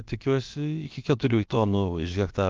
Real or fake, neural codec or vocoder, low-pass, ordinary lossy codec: fake; codec, 16 kHz, about 1 kbps, DyCAST, with the encoder's durations; 7.2 kHz; Opus, 32 kbps